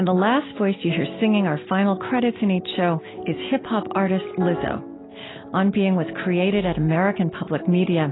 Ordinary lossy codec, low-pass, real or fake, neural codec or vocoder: AAC, 16 kbps; 7.2 kHz; real; none